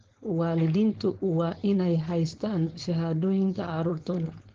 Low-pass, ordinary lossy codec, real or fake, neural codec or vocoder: 7.2 kHz; Opus, 16 kbps; fake; codec, 16 kHz, 4.8 kbps, FACodec